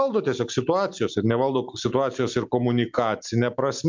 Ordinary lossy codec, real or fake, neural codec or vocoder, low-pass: MP3, 64 kbps; real; none; 7.2 kHz